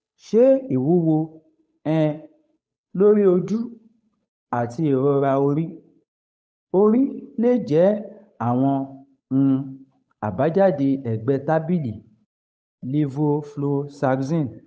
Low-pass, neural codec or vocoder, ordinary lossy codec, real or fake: none; codec, 16 kHz, 8 kbps, FunCodec, trained on Chinese and English, 25 frames a second; none; fake